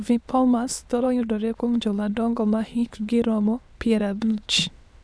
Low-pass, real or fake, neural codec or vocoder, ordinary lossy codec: none; fake; autoencoder, 22.05 kHz, a latent of 192 numbers a frame, VITS, trained on many speakers; none